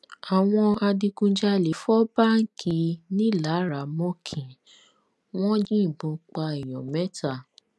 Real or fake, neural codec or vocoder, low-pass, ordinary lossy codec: real; none; none; none